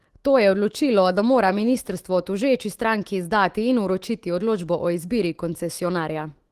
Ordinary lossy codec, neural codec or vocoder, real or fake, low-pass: Opus, 16 kbps; autoencoder, 48 kHz, 128 numbers a frame, DAC-VAE, trained on Japanese speech; fake; 14.4 kHz